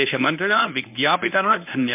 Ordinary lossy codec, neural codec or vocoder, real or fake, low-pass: MP3, 32 kbps; codec, 24 kHz, 0.9 kbps, WavTokenizer, medium speech release version 1; fake; 3.6 kHz